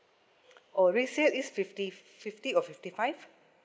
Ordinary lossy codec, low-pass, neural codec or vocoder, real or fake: none; none; none; real